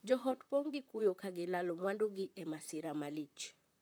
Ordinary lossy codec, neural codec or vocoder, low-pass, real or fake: none; vocoder, 44.1 kHz, 128 mel bands, Pupu-Vocoder; none; fake